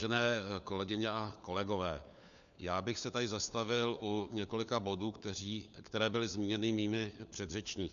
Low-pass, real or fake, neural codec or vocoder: 7.2 kHz; fake; codec, 16 kHz, 4 kbps, FunCodec, trained on LibriTTS, 50 frames a second